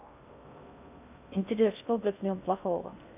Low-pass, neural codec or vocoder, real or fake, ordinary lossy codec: 3.6 kHz; codec, 16 kHz in and 24 kHz out, 0.6 kbps, FocalCodec, streaming, 2048 codes; fake; none